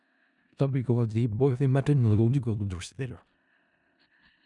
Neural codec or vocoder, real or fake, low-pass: codec, 16 kHz in and 24 kHz out, 0.4 kbps, LongCat-Audio-Codec, four codebook decoder; fake; 10.8 kHz